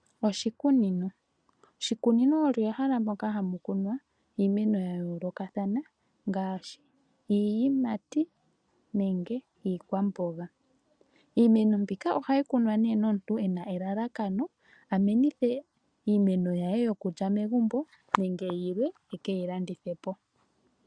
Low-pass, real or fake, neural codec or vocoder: 9.9 kHz; real; none